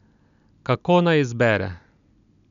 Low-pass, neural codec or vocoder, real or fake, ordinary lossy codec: 7.2 kHz; none; real; none